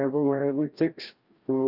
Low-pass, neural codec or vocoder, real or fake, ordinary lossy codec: 5.4 kHz; codec, 16 kHz, 1 kbps, FreqCodec, larger model; fake; Opus, 24 kbps